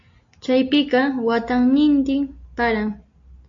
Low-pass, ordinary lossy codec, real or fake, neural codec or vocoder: 7.2 kHz; AAC, 48 kbps; real; none